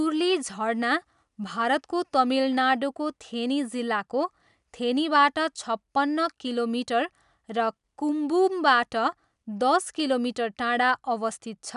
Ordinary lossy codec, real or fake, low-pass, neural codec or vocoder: none; real; 10.8 kHz; none